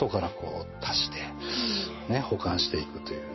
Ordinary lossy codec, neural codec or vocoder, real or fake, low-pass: MP3, 24 kbps; none; real; 7.2 kHz